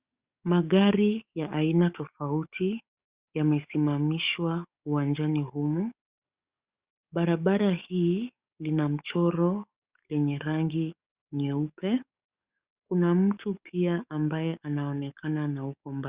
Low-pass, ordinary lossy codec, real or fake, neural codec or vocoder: 3.6 kHz; Opus, 64 kbps; fake; codec, 44.1 kHz, 7.8 kbps, DAC